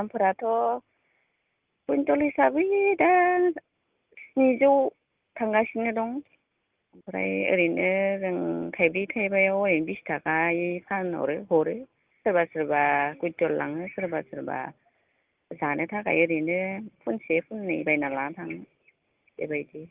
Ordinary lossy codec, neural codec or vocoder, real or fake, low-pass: Opus, 32 kbps; none; real; 3.6 kHz